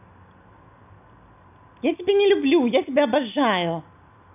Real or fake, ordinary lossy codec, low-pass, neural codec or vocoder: real; none; 3.6 kHz; none